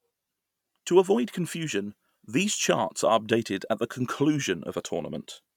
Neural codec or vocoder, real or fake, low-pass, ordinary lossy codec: none; real; 19.8 kHz; none